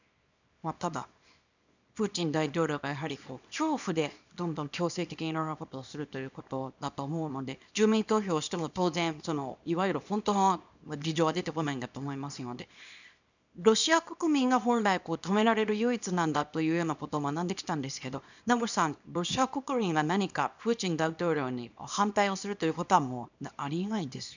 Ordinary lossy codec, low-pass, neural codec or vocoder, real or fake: none; 7.2 kHz; codec, 24 kHz, 0.9 kbps, WavTokenizer, small release; fake